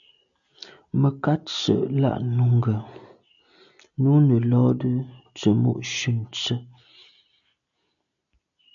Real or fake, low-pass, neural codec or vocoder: real; 7.2 kHz; none